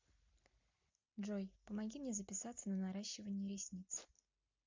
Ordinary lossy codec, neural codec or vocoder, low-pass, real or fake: MP3, 64 kbps; none; 7.2 kHz; real